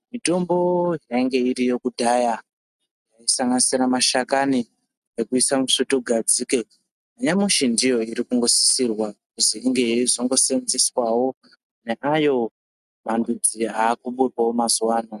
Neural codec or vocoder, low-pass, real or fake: none; 14.4 kHz; real